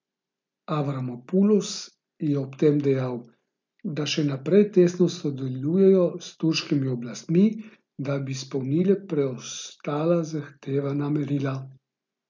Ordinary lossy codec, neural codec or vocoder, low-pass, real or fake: MP3, 64 kbps; none; 7.2 kHz; real